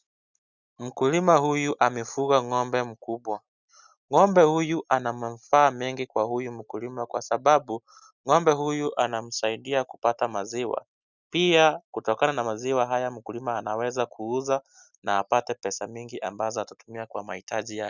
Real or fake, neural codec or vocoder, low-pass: real; none; 7.2 kHz